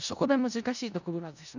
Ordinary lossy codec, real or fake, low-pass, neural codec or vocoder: none; fake; 7.2 kHz; codec, 16 kHz in and 24 kHz out, 0.4 kbps, LongCat-Audio-Codec, four codebook decoder